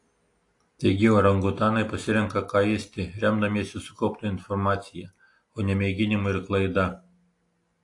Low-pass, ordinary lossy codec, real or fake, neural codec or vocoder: 10.8 kHz; AAC, 48 kbps; real; none